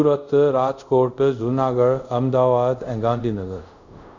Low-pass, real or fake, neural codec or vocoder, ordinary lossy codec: 7.2 kHz; fake; codec, 24 kHz, 0.5 kbps, DualCodec; none